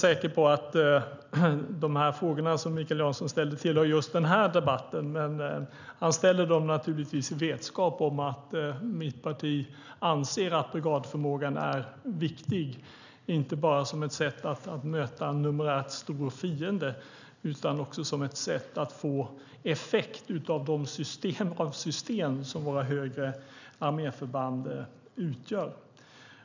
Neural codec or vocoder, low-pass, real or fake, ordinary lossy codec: none; 7.2 kHz; real; none